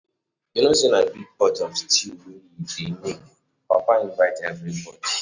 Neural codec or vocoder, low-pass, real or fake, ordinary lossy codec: none; 7.2 kHz; real; none